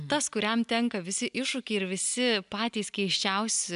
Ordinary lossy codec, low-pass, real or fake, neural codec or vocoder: MP3, 96 kbps; 10.8 kHz; real; none